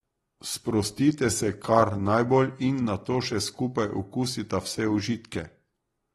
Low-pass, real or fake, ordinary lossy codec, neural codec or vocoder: 19.8 kHz; real; AAC, 32 kbps; none